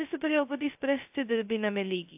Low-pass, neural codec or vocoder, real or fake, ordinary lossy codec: 3.6 kHz; codec, 16 kHz, 0.2 kbps, FocalCodec; fake; AAC, 32 kbps